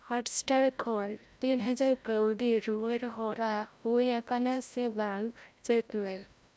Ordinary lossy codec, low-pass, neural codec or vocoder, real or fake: none; none; codec, 16 kHz, 0.5 kbps, FreqCodec, larger model; fake